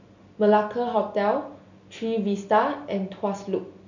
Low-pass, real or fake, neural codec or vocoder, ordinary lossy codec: 7.2 kHz; real; none; none